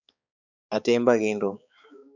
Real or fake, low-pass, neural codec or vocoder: fake; 7.2 kHz; codec, 16 kHz, 4 kbps, X-Codec, HuBERT features, trained on balanced general audio